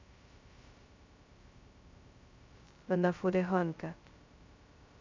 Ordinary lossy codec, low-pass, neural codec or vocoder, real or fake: MP3, 64 kbps; 7.2 kHz; codec, 16 kHz, 0.2 kbps, FocalCodec; fake